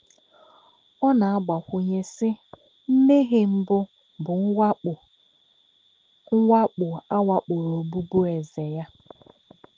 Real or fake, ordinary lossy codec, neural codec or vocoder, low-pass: real; Opus, 16 kbps; none; 7.2 kHz